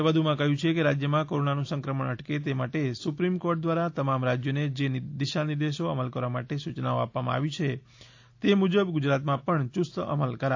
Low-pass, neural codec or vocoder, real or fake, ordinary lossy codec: 7.2 kHz; none; real; AAC, 48 kbps